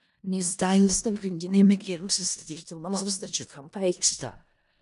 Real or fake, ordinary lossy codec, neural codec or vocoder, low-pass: fake; AAC, 96 kbps; codec, 16 kHz in and 24 kHz out, 0.4 kbps, LongCat-Audio-Codec, four codebook decoder; 10.8 kHz